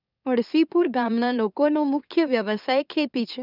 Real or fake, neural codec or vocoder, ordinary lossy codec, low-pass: fake; autoencoder, 44.1 kHz, a latent of 192 numbers a frame, MeloTTS; none; 5.4 kHz